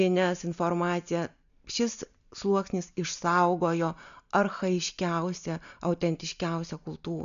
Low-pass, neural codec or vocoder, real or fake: 7.2 kHz; none; real